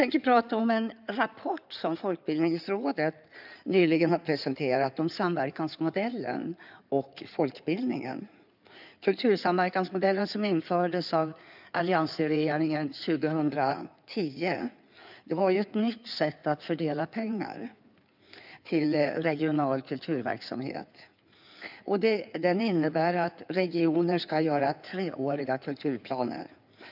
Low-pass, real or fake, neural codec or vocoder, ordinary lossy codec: 5.4 kHz; fake; codec, 16 kHz in and 24 kHz out, 2.2 kbps, FireRedTTS-2 codec; none